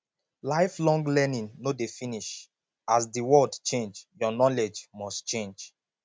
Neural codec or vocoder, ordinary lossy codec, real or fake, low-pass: none; none; real; none